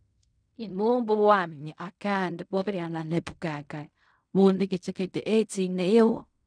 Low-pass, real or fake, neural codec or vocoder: 9.9 kHz; fake; codec, 16 kHz in and 24 kHz out, 0.4 kbps, LongCat-Audio-Codec, fine tuned four codebook decoder